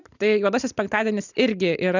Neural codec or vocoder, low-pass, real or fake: none; 7.2 kHz; real